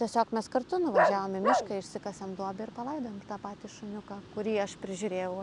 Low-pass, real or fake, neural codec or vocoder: 10.8 kHz; real; none